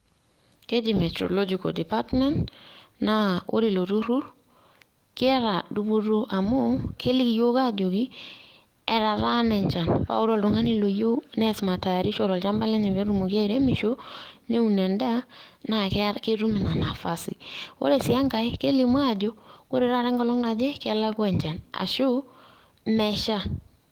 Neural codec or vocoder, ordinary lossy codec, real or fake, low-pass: codec, 44.1 kHz, 7.8 kbps, DAC; Opus, 24 kbps; fake; 19.8 kHz